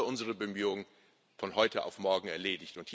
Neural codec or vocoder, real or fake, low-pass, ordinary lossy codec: none; real; none; none